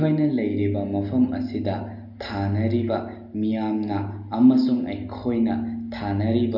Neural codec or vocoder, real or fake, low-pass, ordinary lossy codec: none; real; 5.4 kHz; none